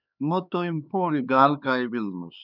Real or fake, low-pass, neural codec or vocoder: fake; 5.4 kHz; codec, 16 kHz, 4 kbps, X-Codec, HuBERT features, trained on LibriSpeech